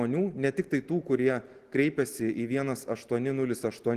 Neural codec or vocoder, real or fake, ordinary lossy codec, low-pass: none; real; Opus, 16 kbps; 14.4 kHz